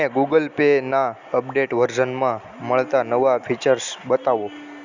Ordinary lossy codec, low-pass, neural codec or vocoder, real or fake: Opus, 64 kbps; 7.2 kHz; none; real